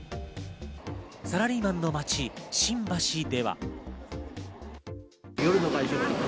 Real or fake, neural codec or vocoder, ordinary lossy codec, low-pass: real; none; none; none